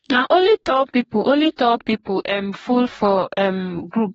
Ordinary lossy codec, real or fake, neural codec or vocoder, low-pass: AAC, 24 kbps; fake; codec, 44.1 kHz, 2.6 kbps, DAC; 19.8 kHz